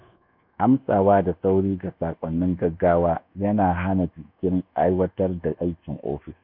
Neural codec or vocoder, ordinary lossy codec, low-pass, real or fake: codec, 24 kHz, 1.2 kbps, DualCodec; none; 5.4 kHz; fake